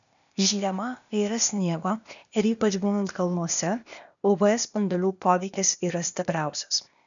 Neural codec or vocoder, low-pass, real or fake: codec, 16 kHz, 0.8 kbps, ZipCodec; 7.2 kHz; fake